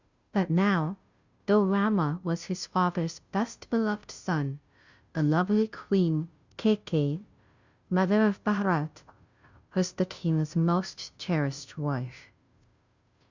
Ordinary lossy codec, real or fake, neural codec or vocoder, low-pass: Opus, 64 kbps; fake; codec, 16 kHz, 0.5 kbps, FunCodec, trained on Chinese and English, 25 frames a second; 7.2 kHz